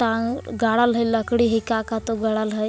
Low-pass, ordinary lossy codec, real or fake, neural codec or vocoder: none; none; real; none